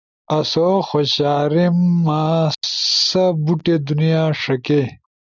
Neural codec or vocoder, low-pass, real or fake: none; 7.2 kHz; real